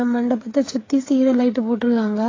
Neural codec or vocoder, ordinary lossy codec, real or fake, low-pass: codec, 16 kHz, 8 kbps, FreqCodec, smaller model; AAC, 32 kbps; fake; 7.2 kHz